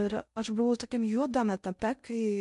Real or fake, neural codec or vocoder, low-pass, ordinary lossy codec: fake; codec, 16 kHz in and 24 kHz out, 0.6 kbps, FocalCodec, streaming, 2048 codes; 10.8 kHz; MP3, 64 kbps